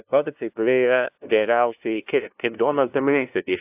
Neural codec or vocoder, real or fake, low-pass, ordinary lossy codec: codec, 16 kHz, 0.5 kbps, FunCodec, trained on LibriTTS, 25 frames a second; fake; 3.6 kHz; AAC, 32 kbps